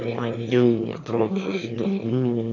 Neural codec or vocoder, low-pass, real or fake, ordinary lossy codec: autoencoder, 22.05 kHz, a latent of 192 numbers a frame, VITS, trained on one speaker; 7.2 kHz; fake; AAC, 48 kbps